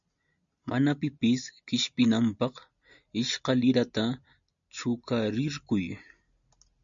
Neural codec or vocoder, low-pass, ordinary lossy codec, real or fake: none; 7.2 kHz; MP3, 64 kbps; real